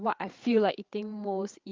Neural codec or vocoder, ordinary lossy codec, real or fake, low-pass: codec, 16 kHz, 8 kbps, FreqCodec, larger model; Opus, 32 kbps; fake; 7.2 kHz